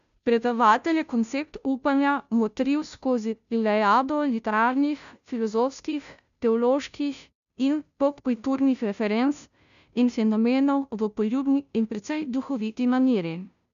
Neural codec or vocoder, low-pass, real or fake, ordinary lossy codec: codec, 16 kHz, 0.5 kbps, FunCodec, trained on Chinese and English, 25 frames a second; 7.2 kHz; fake; none